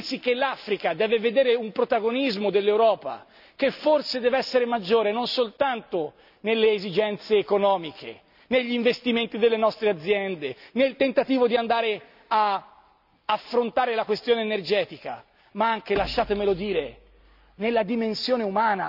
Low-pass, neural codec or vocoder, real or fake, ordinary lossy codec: 5.4 kHz; none; real; none